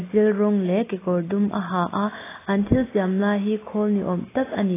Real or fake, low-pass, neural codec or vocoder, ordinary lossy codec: real; 3.6 kHz; none; AAC, 16 kbps